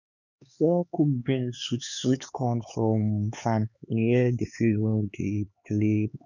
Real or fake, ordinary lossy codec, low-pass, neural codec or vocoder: fake; none; 7.2 kHz; codec, 16 kHz, 4 kbps, X-Codec, HuBERT features, trained on LibriSpeech